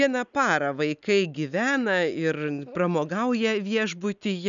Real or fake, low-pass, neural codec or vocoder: real; 7.2 kHz; none